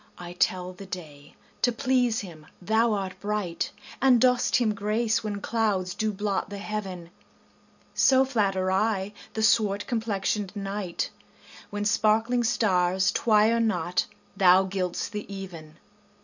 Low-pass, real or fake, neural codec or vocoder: 7.2 kHz; real; none